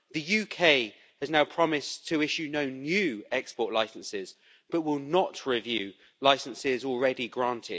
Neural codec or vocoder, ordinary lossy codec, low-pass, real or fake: none; none; none; real